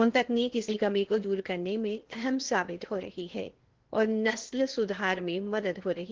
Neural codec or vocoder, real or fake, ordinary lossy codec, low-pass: codec, 16 kHz in and 24 kHz out, 0.8 kbps, FocalCodec, streaming, 65536 codes; fake; Opus, 16 kbps; 7.2 kHz